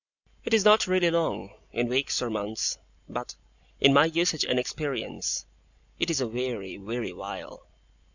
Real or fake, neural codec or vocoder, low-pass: real; none; 7.2 kHz